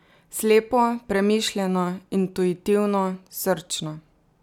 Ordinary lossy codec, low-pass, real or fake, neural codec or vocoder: none; 19.8 kHz; real; none